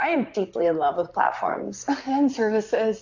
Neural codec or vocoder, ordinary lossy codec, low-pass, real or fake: vocoder, 44.1 kHz, 128 mel bands, Pupu-Vocoder; AAC, 48 kbps; 7.2 kHz; fake